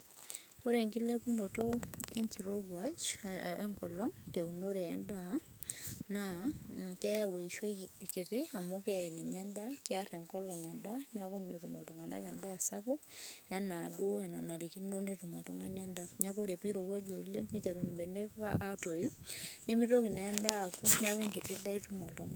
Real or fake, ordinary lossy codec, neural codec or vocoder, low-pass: fake; none; codec, 44.1 kHz, 2.6 kbps, SNAC; none